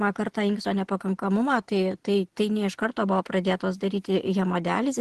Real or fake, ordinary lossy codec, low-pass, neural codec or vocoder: real; Opus, 16 kbps; 10.8 kHz; none